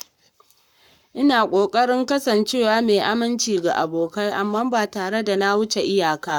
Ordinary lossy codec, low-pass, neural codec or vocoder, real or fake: none; 19.8 kHz; codec, 44.1 kHz, 7.8 kbps, Pupu-Codec; fake